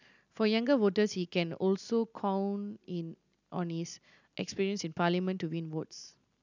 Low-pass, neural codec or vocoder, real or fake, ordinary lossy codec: 7.2 kHz; none; real; none